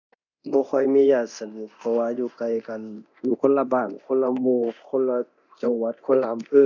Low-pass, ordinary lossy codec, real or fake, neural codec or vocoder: 7.2 kHz; none; fake; codec, 24 kHz, 0.9 kbps, DualCodec